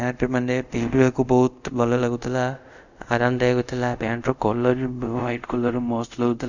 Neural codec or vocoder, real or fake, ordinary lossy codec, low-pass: codec, 24 kHz, 0.5 kbps, DualCodec; fake; none; 7.2 kHz